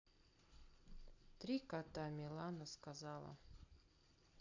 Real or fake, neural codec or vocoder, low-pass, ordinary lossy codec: real; none; 7.2 kHz; AAC, 48 kbps